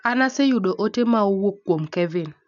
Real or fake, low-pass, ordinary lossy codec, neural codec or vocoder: real; 7.2 kHz; none; none